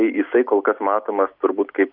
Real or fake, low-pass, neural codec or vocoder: real; 5.4 kHz; none